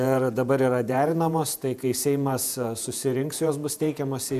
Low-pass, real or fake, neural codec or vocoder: 14.4 kHz; fake; vocoder, 48 kHz, 128 mel bands, Vocos